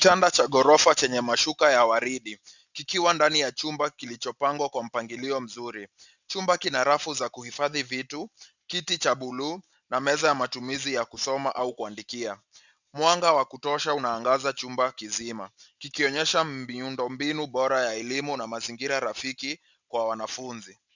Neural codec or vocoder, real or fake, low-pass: none; real; 7.2 kHz